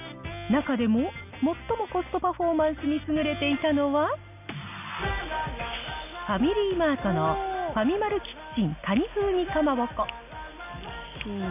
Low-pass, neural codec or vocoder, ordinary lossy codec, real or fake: 3.6 kHz; none; none; real